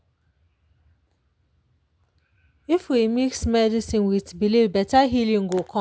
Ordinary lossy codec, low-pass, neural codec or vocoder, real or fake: none; none; none; real